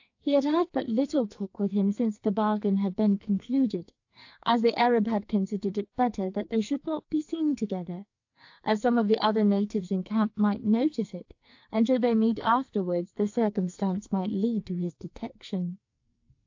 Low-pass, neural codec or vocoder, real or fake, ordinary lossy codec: 7.2 kHz; codec, 44.1 kHz, 2.6 kbps, SNAC; fake; AAC, 48 kbps